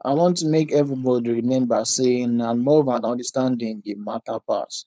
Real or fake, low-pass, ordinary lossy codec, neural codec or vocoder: fake; none; none; codec, 16 kHz, 4.8 kbps, FACodec